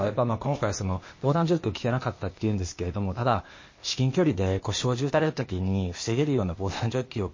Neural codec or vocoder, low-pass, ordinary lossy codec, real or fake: codec, 16 kHz, 0.8 kbps, ZipCodec; 7.2 kHz; MP3, 32 kbps; fake